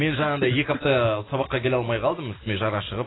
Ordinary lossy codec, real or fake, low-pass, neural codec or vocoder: AAC, 16 kbps; real; 7.2 kHz; none